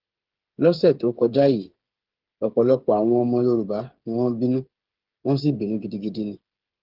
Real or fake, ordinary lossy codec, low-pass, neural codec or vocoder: fake; Opus, 16 kbps; 5.4 kHz; codec, 16 kHz, 8 kbps, FreqCodec, smaller model